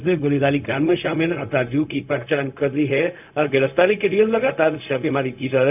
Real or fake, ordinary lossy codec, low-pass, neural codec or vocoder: fake; none; 3.6 kHz; codec, 16 kHz, 0.4 kbps, LongCat-Audio-Codec